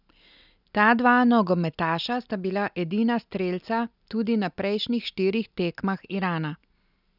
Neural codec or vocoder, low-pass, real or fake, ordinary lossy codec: none; 5.4 kHz; real; none